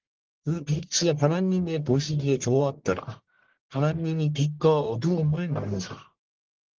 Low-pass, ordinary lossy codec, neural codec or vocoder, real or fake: 7.2 kHz; Opus, 16 kbps; codec, 44.1 kHz, 1.7 kbps, Pupu-Codec; fake